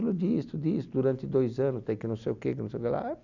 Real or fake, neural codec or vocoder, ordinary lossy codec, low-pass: real; none; none; 7.2 kHz